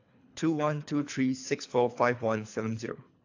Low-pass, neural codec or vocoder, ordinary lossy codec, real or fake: 7.2 kHz; codec, 24 kHz, 3 kbps, HILCodec; AAC, 48 kbps; fake